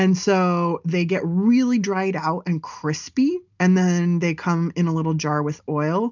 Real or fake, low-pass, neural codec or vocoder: real; 7.2 kHz; none